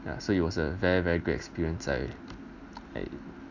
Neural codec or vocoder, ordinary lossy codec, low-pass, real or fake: none; none; 7.2 kHz; real